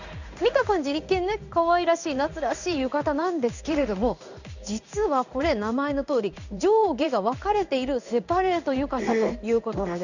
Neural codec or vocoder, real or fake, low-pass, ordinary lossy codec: codec, 16 kHz in and 24 kHz out, 1 kbps, XY-Tokenizer; fake; 7.2 kHz; none